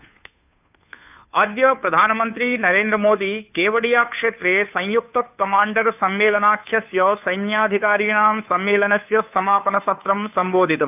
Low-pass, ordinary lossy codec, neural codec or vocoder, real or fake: 3.6 kHz; none; codec, 16 kHz, 2 kbps, FunCodec, trained on Chinese and English, 25 frames a second; fake